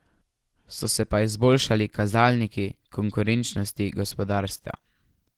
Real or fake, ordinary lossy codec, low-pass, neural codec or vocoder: real; Opus, 16 kbps; 19.8 kHz; none